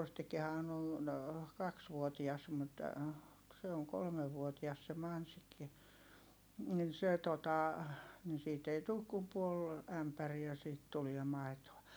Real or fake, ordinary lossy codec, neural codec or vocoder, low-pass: real; none; none; none